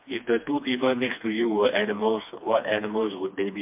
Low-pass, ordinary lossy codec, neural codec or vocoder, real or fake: 3.6 kHz; MP3, 32 kbps; codec, 16 kHz, 2 kbps, FreqCodec, smaller model; fake